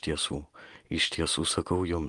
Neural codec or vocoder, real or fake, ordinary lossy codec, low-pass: none; real; Opus, 32 kbps; 10.8 kHz